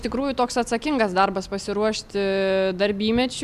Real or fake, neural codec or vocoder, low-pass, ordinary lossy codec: real; none; 14.4 kHz; Opus, 64 kbps